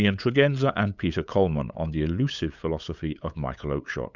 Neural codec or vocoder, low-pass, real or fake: codec, 16 kHz, 8 kbps, FunCodec, trained on LibriTTS, 25 frames a second; 7.2 kHz; fake